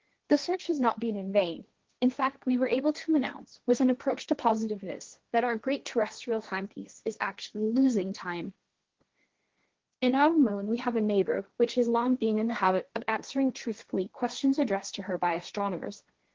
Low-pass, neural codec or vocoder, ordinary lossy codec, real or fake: 7.2 kHz; codec, 16 kHz, 1.1 kbps, Voila-Tokenizer; Opus, 16 kbps; fake